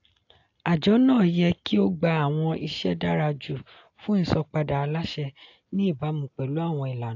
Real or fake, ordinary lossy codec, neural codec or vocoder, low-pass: real; AAC, 48 kbps; none; 7.2 kHz